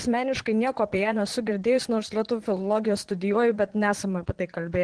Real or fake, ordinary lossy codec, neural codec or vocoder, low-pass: fake; Opus, 16 kbps; vocoder, 22.05 kHz, 80 mel bands, Vocos; 9.9 kHz